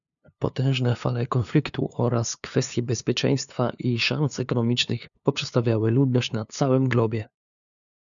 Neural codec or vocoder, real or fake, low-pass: codec, 16 kHz, 2 kbps, FunCodec, trained on LibriTTS, 25 frames a second; fake; 7.2 kHz